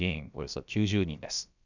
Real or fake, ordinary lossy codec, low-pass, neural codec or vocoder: fake; none; 7.2 kHz; codec, 16 kHz, about 1 kbps, DyCAST, with the encoder's durations